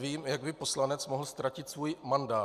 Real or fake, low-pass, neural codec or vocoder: real; 14.4 kHz; none